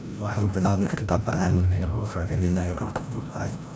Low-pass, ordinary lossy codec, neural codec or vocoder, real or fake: none; none; codec, 16 kHz, 0.5 kbps, FreqCodec, larger model; fake